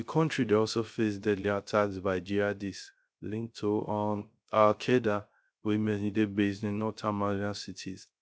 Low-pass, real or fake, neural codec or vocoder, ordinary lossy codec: none; fake; codec, 16 kHz, 0.3 kbps, FocalCodec; none